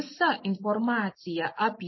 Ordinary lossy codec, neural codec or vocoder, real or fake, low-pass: MP3, 24 kbps; none; real; 7.2 kHz